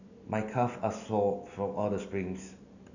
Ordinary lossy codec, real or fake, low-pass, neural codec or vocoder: none; real; 7.2 kHz; none